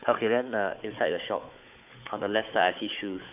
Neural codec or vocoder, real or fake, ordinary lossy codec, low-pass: codec, 16 kHz, 4 kbps, FunCodec, trained on Chinese and English, 50 frames a second; fake; AAC, 32 kbps; 3.6 kHz